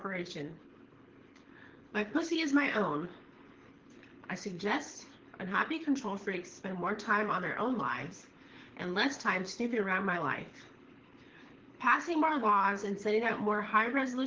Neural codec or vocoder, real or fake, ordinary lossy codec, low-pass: codec, 24 kHz, 6 kbps, HILCodec; fake; Opus, 16 kbps; 7.2 kHz